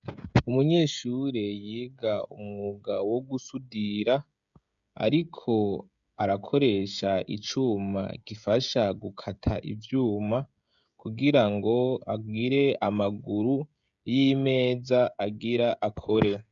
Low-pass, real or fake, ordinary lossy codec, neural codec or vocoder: 7.2 kHz; fake; MP3, 96 kbps; codec, 16 kHz, 16 kbps, FreqCodec, smaller model